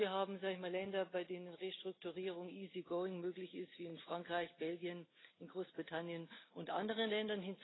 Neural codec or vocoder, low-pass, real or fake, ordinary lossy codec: none; 7.2 kHz; real; AAC, 16 kbps